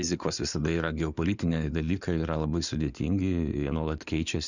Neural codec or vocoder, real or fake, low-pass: codec, 16 kHz in and 24 kHz out, 2.2 kbps, FireRedTTS-2 codec; fake; 7.2 kHz